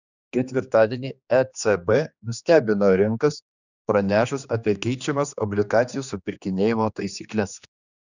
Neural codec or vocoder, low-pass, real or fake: codec, 16 kHz, 2 kbps, X-Codec, HuBERT features, trained on general audio; 7.2 kHz; fake